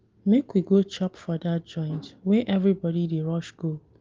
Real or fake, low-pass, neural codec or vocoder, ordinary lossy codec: real; 7.2 kHz; none; Opus, 32 kbps